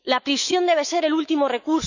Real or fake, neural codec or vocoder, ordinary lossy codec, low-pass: fake; autoencoder, 48 kHz, 128 numbers a frame, DAC-VAE, trained on Japanese speech; none; 7.2 kHz